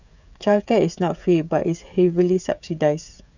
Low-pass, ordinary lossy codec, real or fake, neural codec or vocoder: 7.2 kHz; none; fake; codec, 16 kHz, 16 kbps, FreqCodec, smaller model